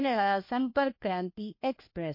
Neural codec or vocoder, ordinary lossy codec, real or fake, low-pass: codec, 16 kHz, 1 kbps, FunCodec, trained on LibriTTS, 50 frames a second; MP3, 32 kbps; fake; 5.4 kHz